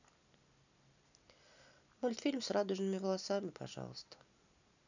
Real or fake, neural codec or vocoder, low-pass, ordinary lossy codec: real; none; 7.2 kHz; none